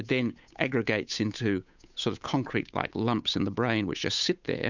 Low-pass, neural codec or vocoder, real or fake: 7.2 kHz; codec, 16 kHz, 8 kbps, FunCodec, trained on Chinese and English, 25 frames a second; fake